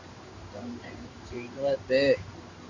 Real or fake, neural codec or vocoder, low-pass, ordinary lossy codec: fake; codec, 16 kHz in and 24 kHz out, 1 kbps, XY-Tokenizer; 7.2 kHz; Opus, 64 kbps